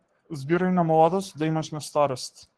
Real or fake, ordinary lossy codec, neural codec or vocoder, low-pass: fake; Opus, 16 kbps; codec, 44.1 kHz, 7.8 kbps, Pupu-Codec; 10.8 kHz